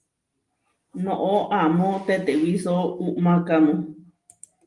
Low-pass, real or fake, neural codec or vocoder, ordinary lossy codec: 10.8 kHz; real; none; Opus, 32 kbps